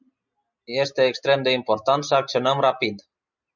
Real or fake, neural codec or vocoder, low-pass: real; none; 7.2 kHz